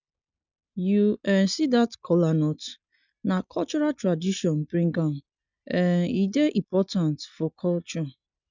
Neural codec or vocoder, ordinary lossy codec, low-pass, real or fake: none; none; 7.2 kHz; real